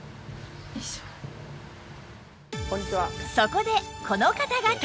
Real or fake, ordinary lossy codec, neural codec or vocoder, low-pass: real; none; none; none